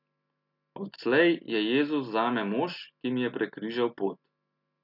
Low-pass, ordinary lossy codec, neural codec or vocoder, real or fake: 5.4 kHz; none; none; real